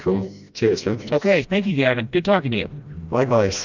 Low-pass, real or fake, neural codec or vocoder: 7.2 kHz; fake; codec, 16 kHz, 1 kbps, FreqCodec, smaller model